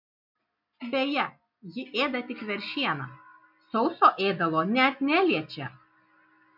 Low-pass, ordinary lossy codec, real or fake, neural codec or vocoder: 5.4 kHz; MP3, 48 kbps; real; none